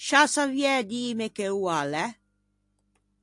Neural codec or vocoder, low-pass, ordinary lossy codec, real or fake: none; 10.8 kHz; AAC, 64 kbps; real